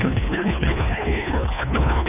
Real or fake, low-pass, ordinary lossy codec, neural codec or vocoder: fake; 3.6 kHz; none; codec, 24 kHz, 1.5 kbps, HILCodec